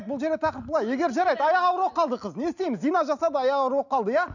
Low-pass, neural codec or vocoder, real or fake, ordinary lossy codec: 7.2 kHz; none; real; none